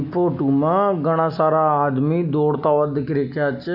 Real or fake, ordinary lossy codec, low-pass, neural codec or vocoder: real; AAC, 48 kbps; 5.4 kHz; none